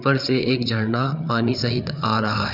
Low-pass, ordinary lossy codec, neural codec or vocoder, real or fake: 5.4 kHz; none; codec, 16 kHz, 8 kbps, FreqCodec, larger model; fake